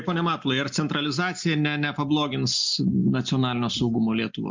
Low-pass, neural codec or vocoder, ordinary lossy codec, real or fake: 7.2 kHz; none; MP3, 64 kbps; real